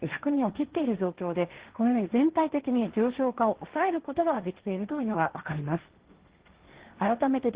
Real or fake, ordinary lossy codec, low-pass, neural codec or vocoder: fake; Opus, 16 kbps; 3.6 kHz; codec, 16 kHz, 1.1 kbps, Voila-Tokenizer